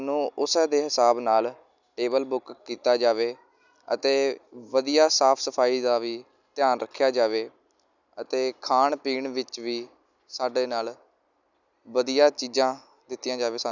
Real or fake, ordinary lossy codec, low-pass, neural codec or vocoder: real; none; 7.2 kHz; none